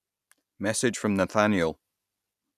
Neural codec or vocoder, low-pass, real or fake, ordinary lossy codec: none; 14.4 kHz; real; none